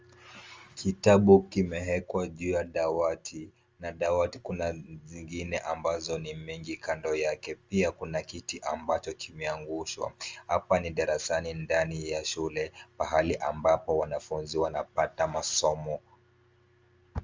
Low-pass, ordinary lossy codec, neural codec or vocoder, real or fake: 7.2 kHz; Opus, 32 kbps; none; real